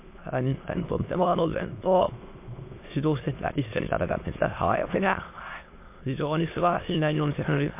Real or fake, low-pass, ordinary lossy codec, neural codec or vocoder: fake; 3.6 kHz; MP3, 24 kbps; autoencoder, 22.05 kHz, a latent of 192 numbers a frame, VITS, trained on many speakers